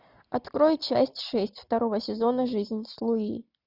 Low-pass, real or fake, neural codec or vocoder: 5.4 kHz; real; none